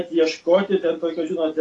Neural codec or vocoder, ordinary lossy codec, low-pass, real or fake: none; AAC, 32 kbps; 10.8 kHz; real